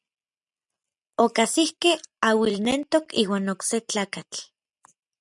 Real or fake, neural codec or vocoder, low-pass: real; none; 10.8 kHz